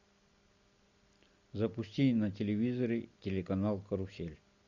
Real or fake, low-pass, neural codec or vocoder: real; 7.2 kHz; none